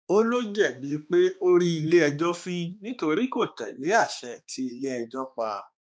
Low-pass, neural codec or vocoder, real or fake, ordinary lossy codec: none; codec, 16 kHz, 2 kbps, X-Codec, HuBERT features, trained on balanced general audio; fake; none